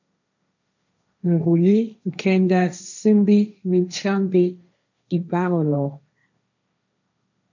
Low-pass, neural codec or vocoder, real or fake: 7.2 kHz; codec, 16 kHz, 1.1 kbps, Voila-Tokenizer; fake